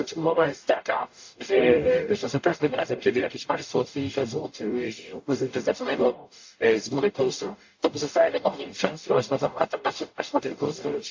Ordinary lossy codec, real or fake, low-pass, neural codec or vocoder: AAC, 48 kbps; fake; 7.2 kHz; codec, 44.1 kHz, 0.9 kbps, DAC